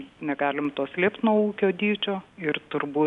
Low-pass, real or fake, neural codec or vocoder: 10.8 kHz; real; none